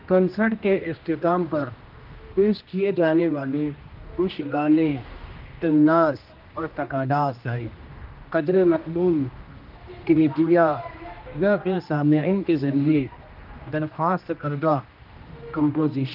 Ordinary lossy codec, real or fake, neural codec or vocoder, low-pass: Opus, 32 kbps; fake; codec, 16 kHz, 1 kbps, X-Codec, HuBERT features, trained on general audio; 5.4 kHz